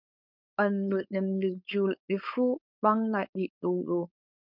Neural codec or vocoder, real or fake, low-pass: codec, 16 kHz, 4.8 kbps, FACodec; fake; 5.4 kHz